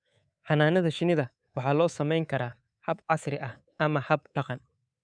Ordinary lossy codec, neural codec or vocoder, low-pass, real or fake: none; codec, 24 kHz, 3.1 kbps, DualCodec; 9.9 kHz; fake